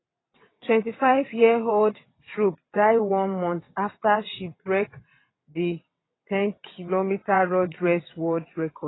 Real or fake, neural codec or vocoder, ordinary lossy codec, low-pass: fake; vocoder, 22.05 kHz, 80 mel bands, WaveNeXt; AAC, 16 kbps; 7.2 kHz